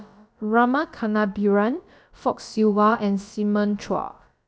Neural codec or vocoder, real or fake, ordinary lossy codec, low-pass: codec, 16 kHz, about 1 kbps, DyCAST, with the encoder's durations; fake; none; none